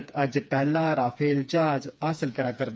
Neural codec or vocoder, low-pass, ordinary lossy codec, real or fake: codec, 16 kHz, 4 kbps, FreqCodec, smaller model; none; none; fake